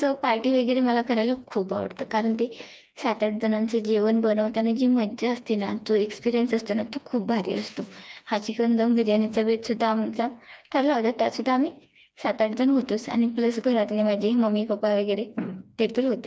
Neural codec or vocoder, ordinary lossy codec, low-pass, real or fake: codec, 16 kHz, 2 kbps, FreqCodec, smaller model; none; none; fake